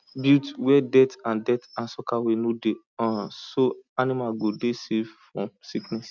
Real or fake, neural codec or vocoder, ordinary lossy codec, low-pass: real; none; none; 7.2 kHz